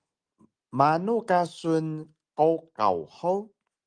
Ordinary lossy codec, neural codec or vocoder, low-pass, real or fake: Opus, 32 kbps; none; 9.9 kHz; real